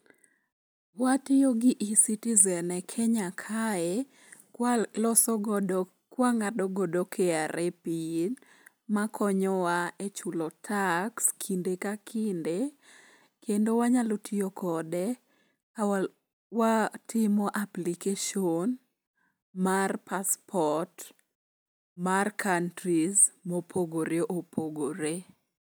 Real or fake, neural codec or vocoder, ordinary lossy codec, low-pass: real; none; none; none